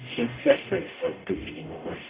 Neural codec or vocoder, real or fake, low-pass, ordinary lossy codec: codec, 44.1 kHz, 0.9 kbps, DAC; fake; 3.6 kHz; Opus, 64 kbps